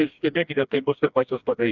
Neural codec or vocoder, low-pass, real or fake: codec, 16 kHz, 1 kbps, FreqCodec, smaller model; 7.2 kHz; fake